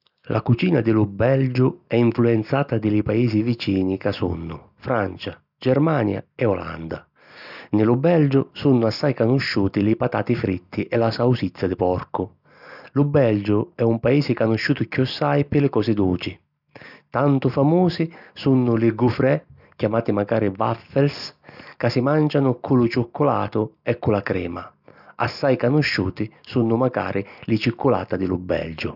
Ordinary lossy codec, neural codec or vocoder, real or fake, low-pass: none; none; real; 5.4 kHz